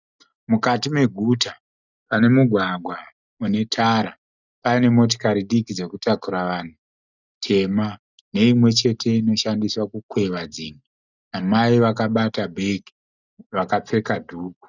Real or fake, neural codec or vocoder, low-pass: real; none; 7.2 kHz